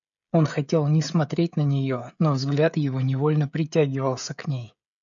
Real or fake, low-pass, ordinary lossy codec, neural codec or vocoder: fake; 7.2 kHz; MP3, 96 kbps; codec, 16 kHz, 16 kbps, FreqCodec, smaller model